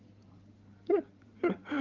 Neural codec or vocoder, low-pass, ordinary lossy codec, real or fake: codec, 16 kHz in and 24 kHz out, 2.2 kbps, FireRedTTS-2 codec; 7.2 kHz; Opus, 24 kbps; fake